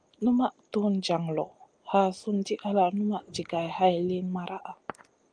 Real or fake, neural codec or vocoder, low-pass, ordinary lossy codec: real; none; 9.9 kHz; Opus, 32 kbps